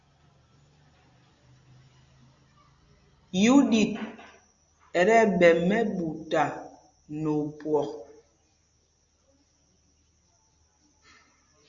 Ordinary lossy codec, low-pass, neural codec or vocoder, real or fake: Opus, 64 kbps; 7.2 kHz; none; real